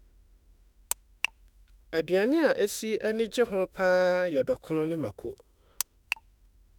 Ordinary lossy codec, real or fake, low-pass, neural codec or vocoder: none; fake; 19.8 kHz; autoencoder, 48 kHz, 32 numbers a frame, DAC-VAE, trained on Japanese speech